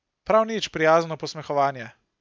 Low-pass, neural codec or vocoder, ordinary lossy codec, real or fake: none; none; none; real